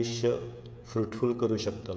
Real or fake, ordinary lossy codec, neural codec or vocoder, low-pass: fake; none; codec, 16 kHz, 16 kbps, FreqCodec, smaller model; none